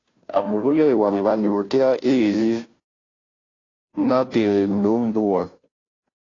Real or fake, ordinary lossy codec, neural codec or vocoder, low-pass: fake; AAC, 32 kbps; codec, 16 kHz, 0.5 kbps, FunCodec, trained on Chinese and English, 25 frames a second; 7.2 kHz